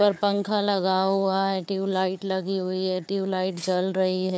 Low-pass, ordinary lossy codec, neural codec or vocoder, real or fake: none; none; codec, 16 kHz, 4 kbps, FunCodec, trained on Chinese and English, 50 frames a second; fake